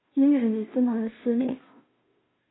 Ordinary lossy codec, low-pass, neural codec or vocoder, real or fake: AAC, 16 kbps; 7.2 kHz; codec, 16 kHz, 0.5 kbps, FunCodec, trained on Chinese and English, 25 frames a second; fake